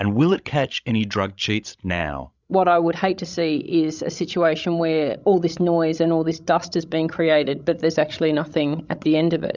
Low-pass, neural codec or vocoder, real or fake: 7.2 kHz; codec, 16 kHz, 16 kbps, FreqCodec, larger model; fake